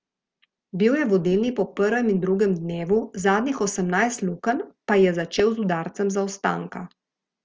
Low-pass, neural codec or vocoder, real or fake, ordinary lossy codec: 7.2 kHz; none; real; Opus, 24 kbps